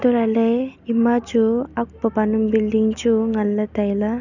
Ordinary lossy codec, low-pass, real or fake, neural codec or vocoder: none; 7.2 kHz; real; none